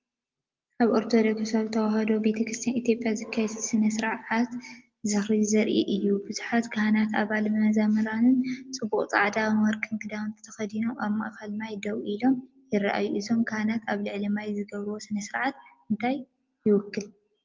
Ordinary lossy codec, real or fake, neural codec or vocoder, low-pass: Opus, 32 kbps; real; none; 7.2 kHz